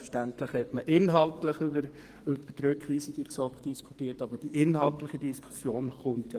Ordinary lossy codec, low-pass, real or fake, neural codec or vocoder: Opus, 24 kbps; 14.4 kHz; fake; codec, 44.1 kHz, 2.6 kbps, SNAC